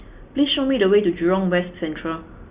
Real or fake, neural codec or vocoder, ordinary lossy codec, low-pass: real; none; Opus, 64 kbps; 3.6 kHz